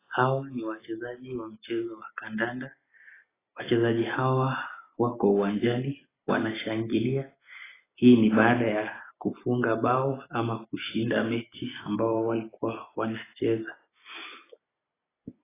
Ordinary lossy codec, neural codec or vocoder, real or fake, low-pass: AAC, 16 kbps; none; real; 3.6 kHz